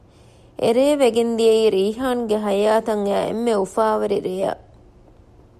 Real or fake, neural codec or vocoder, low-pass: real; none; 14.4 kHz